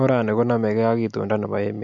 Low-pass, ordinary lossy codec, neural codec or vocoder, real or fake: 7.2 kHz; MP3, 48 kbps; none; real